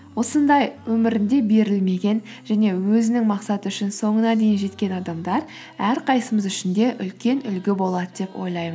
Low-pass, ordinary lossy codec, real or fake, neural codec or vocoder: none; none; real; none